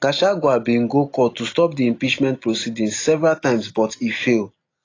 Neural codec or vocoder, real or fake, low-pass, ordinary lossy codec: none; real; 7.2 kHz; AAC, 32 kbps